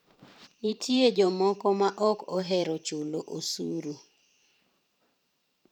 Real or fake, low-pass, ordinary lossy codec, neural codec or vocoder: fake; 19.8 kHz; none; vocoder, 44.1 kHz, 128 mel bands every 512 samples, BigVGAN v2